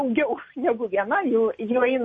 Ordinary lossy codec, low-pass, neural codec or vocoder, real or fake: MP3, 32 kbps; 10.8 kHz; none; real